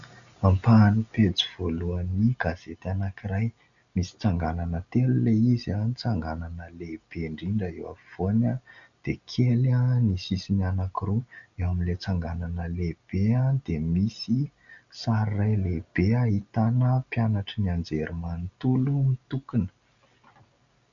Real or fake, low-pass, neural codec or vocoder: real; 7.2 kHz; none